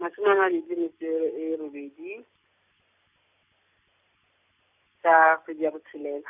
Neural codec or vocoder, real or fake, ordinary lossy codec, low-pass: none; real; none; 3.6 kHz